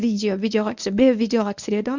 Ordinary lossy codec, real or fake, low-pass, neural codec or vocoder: none; fake; 7.2 kHz; codec, 24 kHz, 0.9 kbps, WavTokenizer, medium speech release version 1